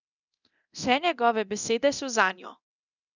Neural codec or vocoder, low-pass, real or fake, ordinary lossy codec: codec, 24 kHz, 0.9 kbps, DualCodec; 7.2 kHz; fake; none